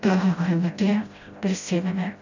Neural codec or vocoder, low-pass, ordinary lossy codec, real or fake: codec, 16 kHz, 0.5 kbps, FreqCodec, smaller model; 7.2 kHz; none; fake